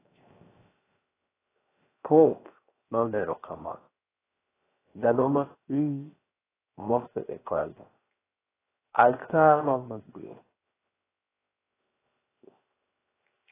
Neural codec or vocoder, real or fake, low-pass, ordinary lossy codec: codec, 16 kHz, 0.7 kbps, FocalCodec; fake; 3.6 kHz; AAC, 16 kbps